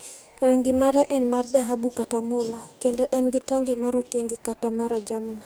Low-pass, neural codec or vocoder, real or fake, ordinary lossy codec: none; codec, 44.1 kHz, 2.6 kbps, DAC; fake; none